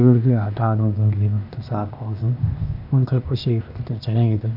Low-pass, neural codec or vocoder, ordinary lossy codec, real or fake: 5.4 kHz; codec, 16 kHz, 0.8 kbps, ZipCodec; none; fake